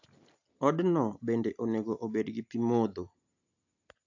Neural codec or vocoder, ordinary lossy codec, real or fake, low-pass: none; AAC, 48 kbps; real; 7.2 kHz